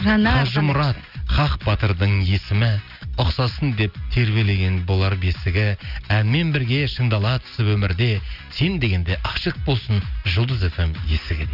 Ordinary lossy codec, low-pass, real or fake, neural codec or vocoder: none; 5.4 kHz; real; none